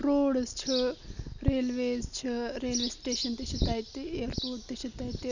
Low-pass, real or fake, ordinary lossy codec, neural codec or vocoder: 7.2 kHz; real; none; none